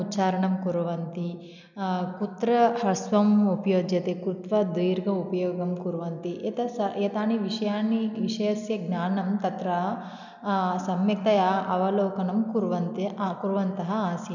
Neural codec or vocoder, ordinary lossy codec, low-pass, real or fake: none; none; 7.2 kHz; real